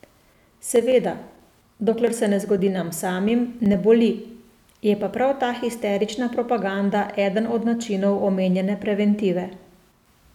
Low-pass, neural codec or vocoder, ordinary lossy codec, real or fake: 19.8 kHz; none; none; real